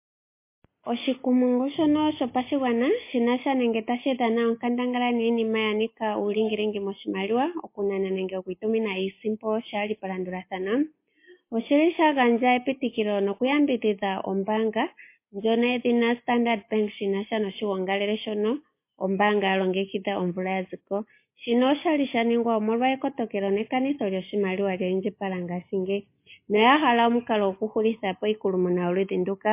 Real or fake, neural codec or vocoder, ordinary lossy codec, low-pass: real; none; MP3, 24 kbps; 3.6 kHz